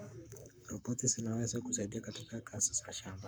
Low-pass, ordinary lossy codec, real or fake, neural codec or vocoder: none; none; fake; codec, 44.1 kHz, 7.8 kbps, Pupu-Codec